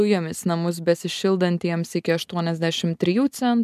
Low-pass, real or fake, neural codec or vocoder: 14.4 kHz; real; none